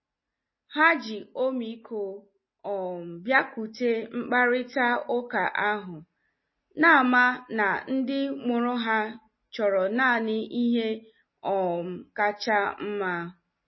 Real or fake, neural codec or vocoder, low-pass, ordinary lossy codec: real; none; 7.2 kHz; MP3, 24 kbps